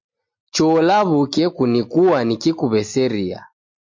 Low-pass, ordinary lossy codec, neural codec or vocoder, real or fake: 7.2 kHz; MP3, 48 kbps; none; real